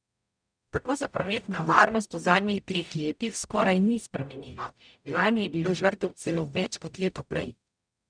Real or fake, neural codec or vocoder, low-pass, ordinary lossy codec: fake; codec, 44.1 kHz, 0.9 kbps, DAC; 9.9 kHz; none